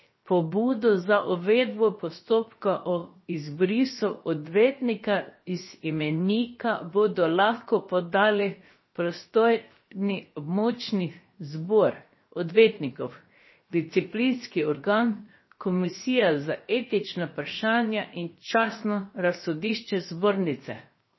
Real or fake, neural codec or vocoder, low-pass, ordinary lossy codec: fake; codec, 16 kHz, 0.7 kbps, FocalCodec; 7.2 kHz; MP3, 24 kbps